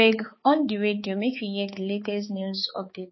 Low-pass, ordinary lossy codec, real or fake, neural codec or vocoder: 7.2 kHz; MP3, 24 kbps; fake; codec, 16 kHz, 4 kbps, X-Codec, HuBERT features, trained on balanced general audio